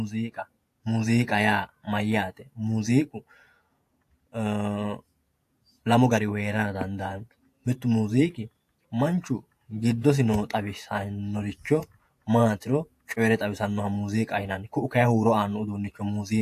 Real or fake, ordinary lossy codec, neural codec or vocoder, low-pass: fake; AAC, 64 kbps; vocoder, 48 kHz, 128 mel bands, Vocos; 14.4 kHz